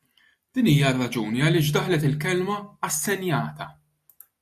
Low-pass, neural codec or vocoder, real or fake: 14.4 kHz; none; real